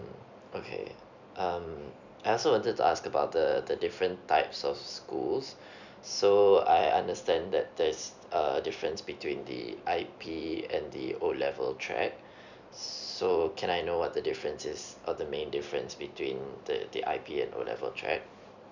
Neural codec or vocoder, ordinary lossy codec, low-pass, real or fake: none; none; 7.2 kHz; real